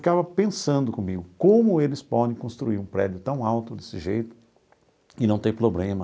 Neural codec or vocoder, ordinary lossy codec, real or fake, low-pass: none; none; real; none